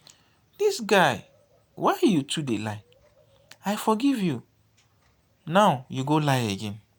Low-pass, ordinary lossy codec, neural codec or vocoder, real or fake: none; none; none; real